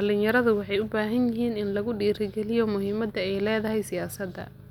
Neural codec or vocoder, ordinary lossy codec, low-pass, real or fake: none; none; 19.8 kHz; real